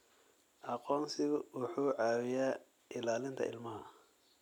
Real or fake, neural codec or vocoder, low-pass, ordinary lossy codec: real; none; 19.8 kHz; none